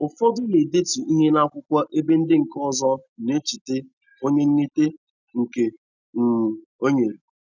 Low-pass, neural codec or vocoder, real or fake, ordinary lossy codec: 7.2 kHz; none; real; none